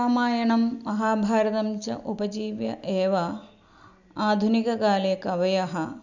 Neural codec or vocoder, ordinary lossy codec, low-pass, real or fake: none; none; 7.2 kHz; real